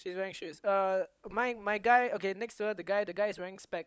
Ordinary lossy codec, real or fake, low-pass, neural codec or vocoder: none; fake; none; codec, 16 kHz, 16 kbps, FunCodec, trained on LibriTTS, 50 frames a second